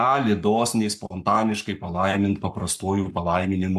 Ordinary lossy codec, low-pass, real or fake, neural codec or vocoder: AAC, 96 kbps; 14.4 kHz; fake; codec, 44.1 kHz, 7.8 kbps, Pupu-Codec